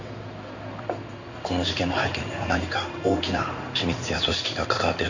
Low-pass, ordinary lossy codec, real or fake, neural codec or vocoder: 7.2 kHz; none; fake; codec, 16 kHz in and 24 kHz out, 1 kbps, XY-Tokenizer